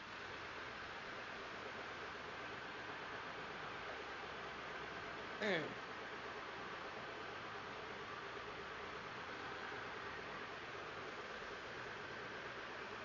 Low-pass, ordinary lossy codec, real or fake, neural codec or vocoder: 7.2 kHz; none; fake; vocoder, 22.05 kHz, 80 mel bands, Vocos